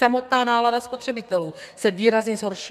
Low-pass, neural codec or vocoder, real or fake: 14.4 kHz; codec, 32 kHz, 1.9 kbps, SNAC; fake